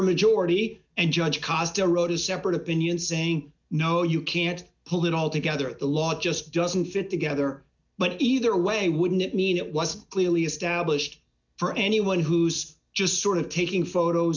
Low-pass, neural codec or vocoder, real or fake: 7.2 kHz; none; real